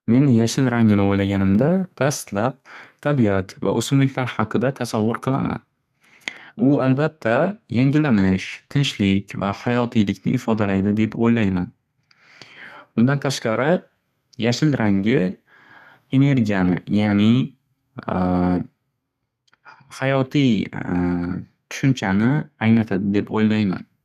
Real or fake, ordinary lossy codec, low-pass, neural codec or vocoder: fake; none; 14.4 kHz; codec, 32 kHz, 1.9 kbps, SNAC